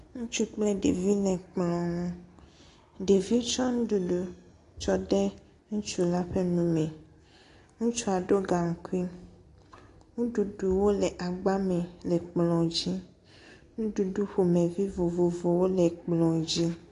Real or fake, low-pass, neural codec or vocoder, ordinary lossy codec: real; 10.8 kHz; none; AAC, 48 kbps